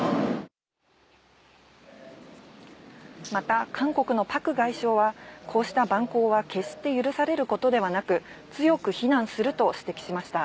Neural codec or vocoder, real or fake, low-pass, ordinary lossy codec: none; real; none; none